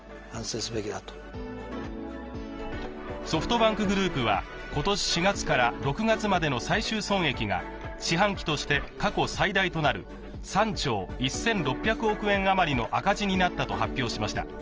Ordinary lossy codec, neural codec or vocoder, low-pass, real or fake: Opus, 24 kbps; none; 7.2 kHz; real